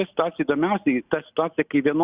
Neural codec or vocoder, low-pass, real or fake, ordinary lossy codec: none; 3.6 kHz; real; Opus, 64 kbps